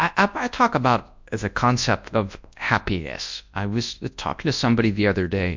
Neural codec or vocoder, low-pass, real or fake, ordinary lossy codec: codec, 24 kHz, 0.9 kbps, WavTokenizer, large speech release; 7.2 kHz; fake; MP3, 64 kbps